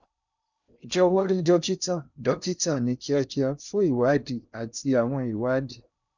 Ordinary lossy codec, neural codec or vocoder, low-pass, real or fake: none; codec, 16 kHz in and 24 kHz out, 0.6 kbps, FocalCodec, streaming, 4096 codes; 7.2 kHz; fake